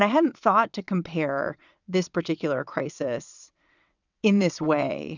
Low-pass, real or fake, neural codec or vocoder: 7.2 kHz; fake; vocoder, 22.05 kHz, 80 mel bands, WaveNeXt